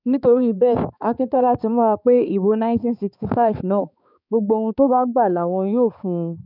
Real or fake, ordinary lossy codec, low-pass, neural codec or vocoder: fake; none; 5.4 kHz; codec, 16 kHz, 4 kbps, X-Codec, HuBERT features, trained on balanced general audio